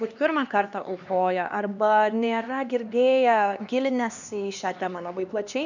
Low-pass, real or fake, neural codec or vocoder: 7.2 kHz; fake; codec, 16 kHz, 2 kbps, X-Codec, HuBERT features, trained on LibriSpeech